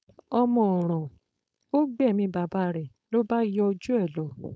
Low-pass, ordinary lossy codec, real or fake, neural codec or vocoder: none; none; fake; codec, 16 kHz, 4.8 kbps, FACodec